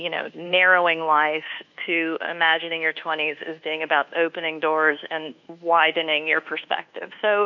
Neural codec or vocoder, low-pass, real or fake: codec, 24 kHz, 1.2 kbps, DualCodec; 7.2 kHz; fake